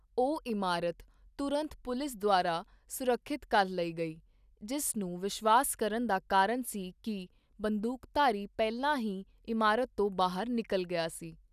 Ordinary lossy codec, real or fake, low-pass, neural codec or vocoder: none; real; 14.4 kHz; none